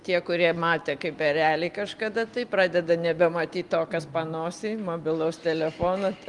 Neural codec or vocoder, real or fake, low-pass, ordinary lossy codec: none; real; 10.8 kHz; Opus, 24 kbps